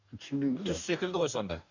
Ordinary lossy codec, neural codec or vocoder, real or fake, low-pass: none; codec, 44.1 kHz, 2.6 kbps, DAC; fake; 7.2 kHz